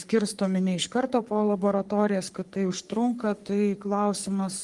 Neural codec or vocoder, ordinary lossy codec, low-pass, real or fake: codec, 44.1 kHz, 3.4 kbps, Pupu-Codec; Opus, 24 kbps; 10.8 kHz; fake